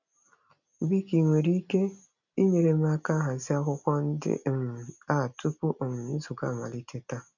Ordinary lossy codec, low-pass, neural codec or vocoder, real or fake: none; 7.2 kHz; none; real